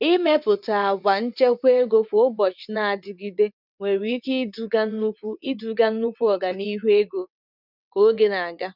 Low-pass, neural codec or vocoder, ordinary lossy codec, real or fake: 5.4 kHz; vocoder, 22.05 kHz, 80 mel bands, WaveNeXt; none; fake